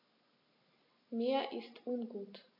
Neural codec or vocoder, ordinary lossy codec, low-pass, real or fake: none; AAC, 48 kbps; 5.4 kHz; real